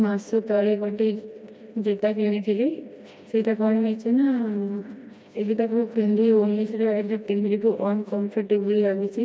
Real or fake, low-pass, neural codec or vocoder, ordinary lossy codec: fake; none; codec, 16 kHz, 1 kbps, FreqCodec, smaller model; none